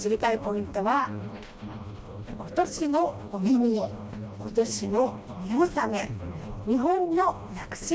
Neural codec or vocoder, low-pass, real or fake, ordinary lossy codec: codec, 16 kHz, 1 kbps, FreqCodec, smaller model; none; fake; none